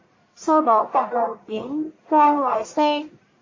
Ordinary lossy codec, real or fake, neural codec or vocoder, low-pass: MP3, 32 kbps; fake; codec, 44.1 kHz, 1.7 kbps, Pupu-Codec; 7.2 kHz